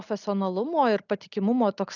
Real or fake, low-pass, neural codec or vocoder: real; 7.2 kHz; none